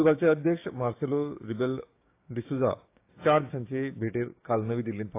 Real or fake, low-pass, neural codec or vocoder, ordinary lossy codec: fake; 3.6 kHz; codec, 16 kHz, 6 kbps, DAC; AAC, 24 kbps